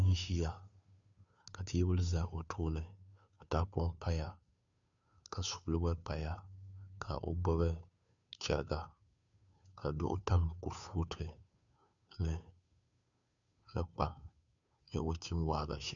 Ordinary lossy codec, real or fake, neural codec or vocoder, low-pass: MP3, 96 kbps; fake; codec, 16 kHz, 2 kbps, FunCodec, trained on Chinese and English, 25 frames a second; 7.2 kHz